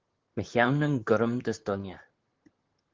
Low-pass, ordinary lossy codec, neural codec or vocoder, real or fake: 7.2 kHz; Opus, 16 kbps; vocoder, 44.1 kHz, 128 mel bands, Pupu-Vocoder; fake